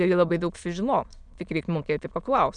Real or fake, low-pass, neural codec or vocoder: fake; 9.9 kHz; autoencoder, 22.05 kHz, a latent of 192 numbers a frame, VITS, trained on many speakers